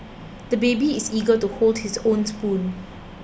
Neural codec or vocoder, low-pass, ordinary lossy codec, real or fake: none; none; none; real